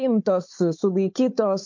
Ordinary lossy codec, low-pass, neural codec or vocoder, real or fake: MP3, 48 kbps; 7.2 kHz; codec, 16 kHz, 4 kbps, FunCodec, trained on Chinese and English, 50 frames a second; fake